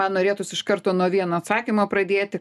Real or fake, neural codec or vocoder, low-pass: real; none; 14.4 kHz